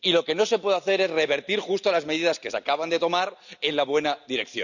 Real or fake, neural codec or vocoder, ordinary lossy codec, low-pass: real; none; none; 7.2 kHz